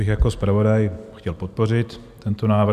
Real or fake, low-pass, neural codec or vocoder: real; 14.4 kHz; none